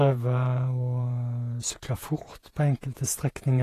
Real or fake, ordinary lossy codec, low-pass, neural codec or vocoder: fake; AAC, 48 kbps; 14.4 kHz; vocoder, 48 kHz, 128 mel bands, Vocos